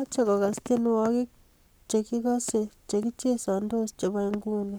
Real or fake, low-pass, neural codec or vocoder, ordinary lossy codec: fake; none; codec, 44.1 kHz, 7.8 kbps, Pupu-Codec; none